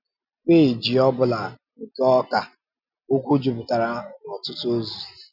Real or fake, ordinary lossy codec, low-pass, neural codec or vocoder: real; none; 5.4 kHz; none